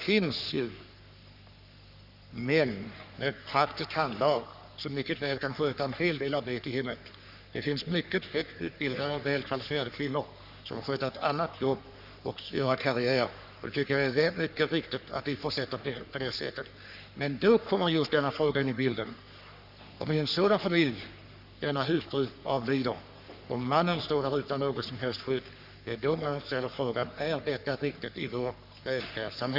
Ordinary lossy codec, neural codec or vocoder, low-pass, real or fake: none; codec, 44.1 kHz, 3.4 kbps, Pupu-Codec; 5.4 kHz; fake